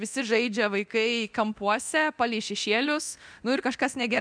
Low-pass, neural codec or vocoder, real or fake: 9.9 kHz; codec, 24 kHz, 0.9 kbps, DualCodec; fake